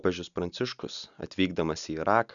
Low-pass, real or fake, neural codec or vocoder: 7.2 kHz; real; none